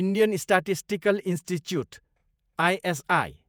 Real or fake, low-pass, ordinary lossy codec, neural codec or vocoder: fake; none; none; vocoder, 48 kHz, 128 mel bands, Vocos